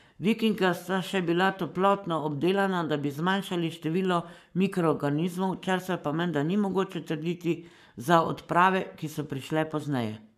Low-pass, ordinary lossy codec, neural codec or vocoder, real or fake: 14.4 kHz; none; codec, 44.1 kHz, 7.8 kbps, Pupu-Codec; fake